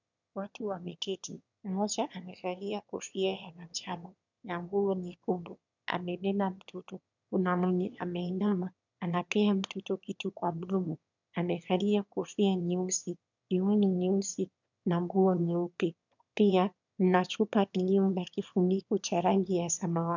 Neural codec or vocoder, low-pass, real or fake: autoencoder, 22.05 kHz, a latent of 192 numbers a frame, VITS, trained on one speaker; 7.2 kHz; fake